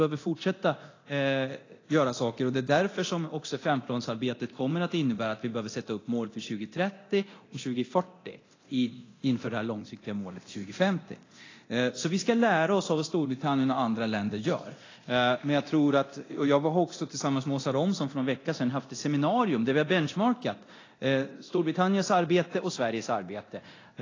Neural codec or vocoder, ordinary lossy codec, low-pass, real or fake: codec, 24 kHz, 0.9 kbps, DualCodec; AAC, 32 kbps; 7.2 kHz; fake